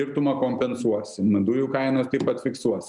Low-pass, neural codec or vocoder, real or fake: 10.8 kHz; none; real